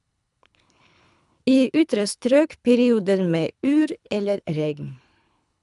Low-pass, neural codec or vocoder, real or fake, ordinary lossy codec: 10.8 kHz; codec, 24 kHz, 3 kbps, HILCodec; fake; none